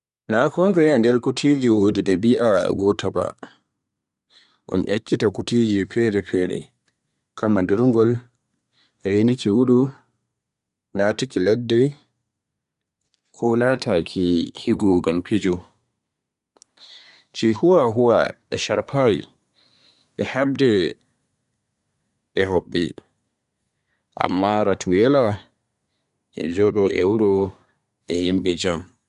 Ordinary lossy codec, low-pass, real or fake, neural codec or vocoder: none; 10.8 kHz; fake; codec, 24 kHz, 1 kbps, SNAC